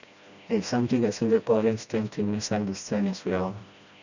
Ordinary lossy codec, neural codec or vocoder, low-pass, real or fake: none; codec, 16 kHz, 1 kbps, FreqCodec, smaller model; 7.2 kHz; fake